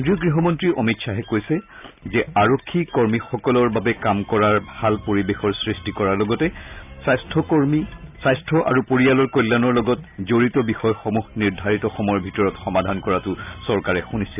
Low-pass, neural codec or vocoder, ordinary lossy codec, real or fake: 3.6 kHz; none; none; real